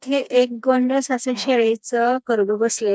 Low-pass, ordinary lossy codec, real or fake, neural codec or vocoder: none; none; fake; codec, 16 kHz, 2 kbps, FreqCodec, smaller model